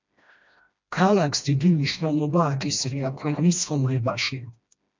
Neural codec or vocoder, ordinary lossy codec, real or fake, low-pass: codec, 16 kHz, 1 kbps, FreqCodec, smaller model; MP3, 64 kbps; fake; 7.2 kHz